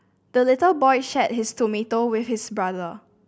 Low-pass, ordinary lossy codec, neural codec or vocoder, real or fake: none; none; none; real